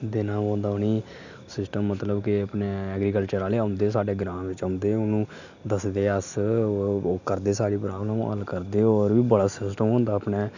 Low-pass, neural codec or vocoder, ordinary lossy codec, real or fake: 7.2 kHz; none; none; real